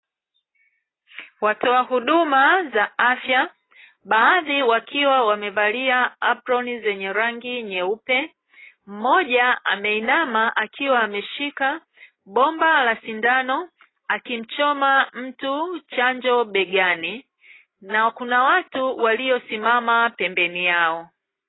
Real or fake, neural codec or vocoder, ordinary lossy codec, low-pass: real; none; AAC, 16 kbps; 7.2 kHz